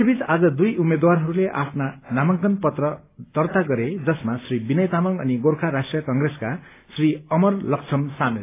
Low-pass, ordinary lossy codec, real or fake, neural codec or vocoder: 3.6 kHz; AAC, 24 kbps; real; none